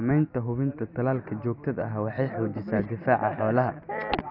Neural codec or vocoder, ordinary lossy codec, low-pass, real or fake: none; none; 5.4 kHz; real